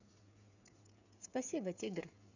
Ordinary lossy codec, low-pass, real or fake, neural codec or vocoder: none; 7.2 kHz; fake; codec, 16 kHz, 8 kbps, FreqCodec, smaller model